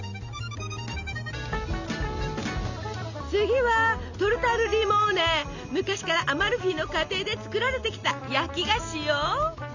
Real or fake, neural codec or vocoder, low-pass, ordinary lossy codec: real; none; 7.2 kHz; none